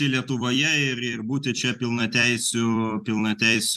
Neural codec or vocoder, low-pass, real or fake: vocoder, 44.1 kHz, 128 mel bands every 256 samples, BigVGAN v2; 14.4 kHz; fake